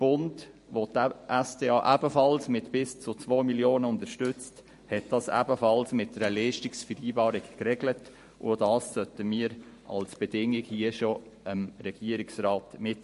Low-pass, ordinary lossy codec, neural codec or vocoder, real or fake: 14.4 kHz; MP3, 48 kbps; vocoder, 44.1 kHz, 128 mel bands every 512 samples, BigVGAN v2; fake